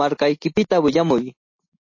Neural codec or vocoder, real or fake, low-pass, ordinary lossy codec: none; real; 7.2 kHz; MP3, 32 kbps